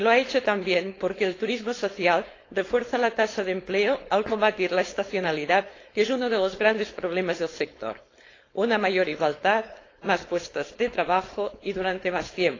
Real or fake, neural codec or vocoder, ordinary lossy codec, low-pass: fake; codec, 16 kHz, 4.8 kbps, FACodec; AAC, 32 kbps; 7.2 kHz